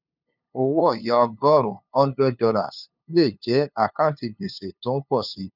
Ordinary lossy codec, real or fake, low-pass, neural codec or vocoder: none; fake; 5.4 kHz; codec, 16 kHz, 2 kbps, FunCodec, trained on LibriTTS, 25 frames a second